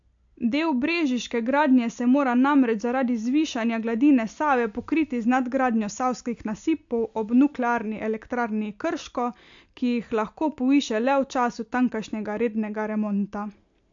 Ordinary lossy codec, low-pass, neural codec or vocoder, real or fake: AAC, 64 kbps; 7.2 kHz; none; real